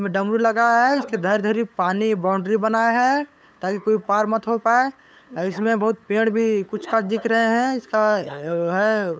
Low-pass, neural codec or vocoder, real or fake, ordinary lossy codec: none; codec, 16 kHz, 8 kbps, FunCodec, trained on LibriTTS, 25 frames a second; fake; none